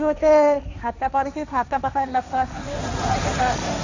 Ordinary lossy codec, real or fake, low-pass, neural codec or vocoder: none; fake; 7.2 kHz; codec, 16 kHz, 1.1 kbps, Voila-Tokenizer